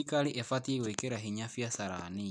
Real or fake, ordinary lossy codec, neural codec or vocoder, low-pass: real; none; none; 9.9 kHz